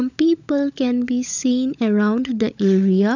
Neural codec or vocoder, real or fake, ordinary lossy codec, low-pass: codec, 16 kHz, 16 kbps, FunCodec, trained on LibriTTS, 50 frames a second; fake; none; 7.2 kHz